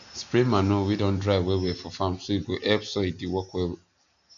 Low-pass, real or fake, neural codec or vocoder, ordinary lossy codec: 7.2 kHz; real; none; none